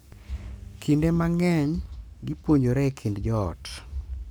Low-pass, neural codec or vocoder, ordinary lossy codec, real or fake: none; codec, 44.1 kHz, 7.8 kbps, Pupu-Codec; none; fake